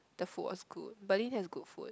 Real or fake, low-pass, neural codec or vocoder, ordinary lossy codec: real; none; none; none